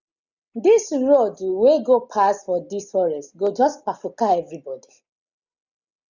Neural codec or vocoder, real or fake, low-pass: none; real; 7.2 kHz